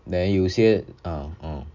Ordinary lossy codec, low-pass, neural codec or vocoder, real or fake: none; 7.2 kHz; none; real